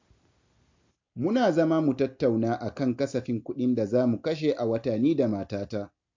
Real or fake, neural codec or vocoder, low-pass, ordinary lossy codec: real; none; 7.2 kHz; MP3, 48 kbps